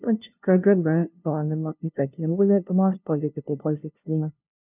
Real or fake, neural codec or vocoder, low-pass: fake; codec, 16 kHz, 0.5 kbps, FunCodec, trained on LibriTTS, 25 frames a second; 3.6 kHz